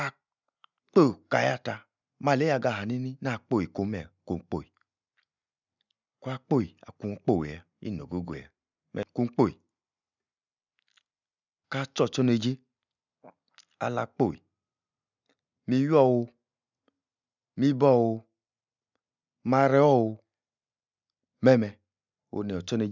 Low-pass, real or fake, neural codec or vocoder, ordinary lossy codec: 7.2 kHz; real; none; none